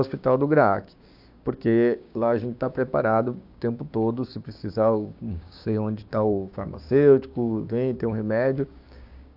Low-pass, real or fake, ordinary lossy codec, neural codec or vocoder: 5.4 kHz; fake; AAC, 48 kbps; codec, 16 kHz, 6 kbps, DAC